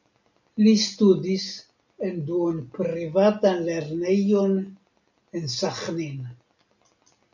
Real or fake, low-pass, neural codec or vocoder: real; 7.2 kHz; none